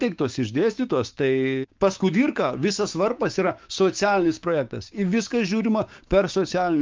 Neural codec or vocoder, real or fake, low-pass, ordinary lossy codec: codec, 16 kHz, 6 kbps, DAC; fake; 7.2 kHz; Opus, 32 kbps